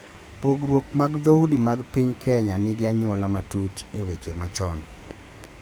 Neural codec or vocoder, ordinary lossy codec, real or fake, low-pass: codec, 44.1 kHz, 2.6 kbps, SNAC; none; fake; none